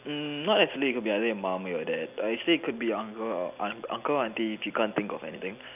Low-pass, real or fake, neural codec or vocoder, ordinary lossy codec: 3.6 kHz; real; none; none